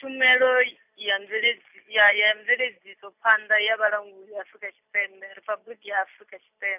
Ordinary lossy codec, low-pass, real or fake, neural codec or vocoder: none; 3.6 kHz; real; none